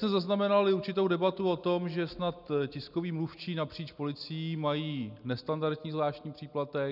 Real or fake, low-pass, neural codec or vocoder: real; 5.4 kHz; none